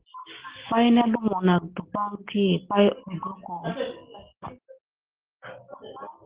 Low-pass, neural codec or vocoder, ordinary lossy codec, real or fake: 3.6 kHz; none; Opus, 16 kbps; real